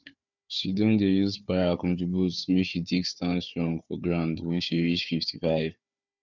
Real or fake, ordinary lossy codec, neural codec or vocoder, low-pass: fake; none; codec, 16 kHz, 4 kbps, FunCodec, trained on Chinese and English, 50 frames a second; 7.2 kHz